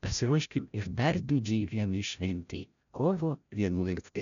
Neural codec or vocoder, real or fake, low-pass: codec, 16 kHz, 0.5 kbps, FreqCodec, larger model; fake; 7.2 kHz